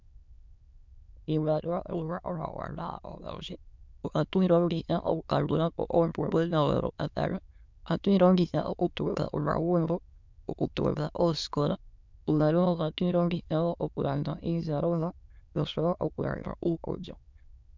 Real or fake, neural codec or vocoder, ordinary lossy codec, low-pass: fake; autoencoder, 22.05 kHz, a latent of 192 numbers a frame, VITS, trained on many speakers; MP3, 64 kbps; 7.2 kHz